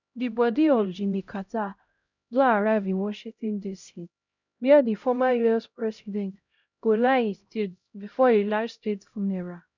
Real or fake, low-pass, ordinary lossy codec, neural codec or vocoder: fake; 7.2 kHz; none; codec, 16 kHz, 0.5 kbps, X-Codec, HuBERT features, trained on LibriSpeech